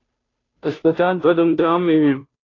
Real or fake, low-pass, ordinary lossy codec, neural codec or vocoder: fake; 7.2 kHz; AAC, 32 kbps; codec, 16 kHz, 0.5 kbps, FunCodec, trained on Chinese and English, 25 frames a second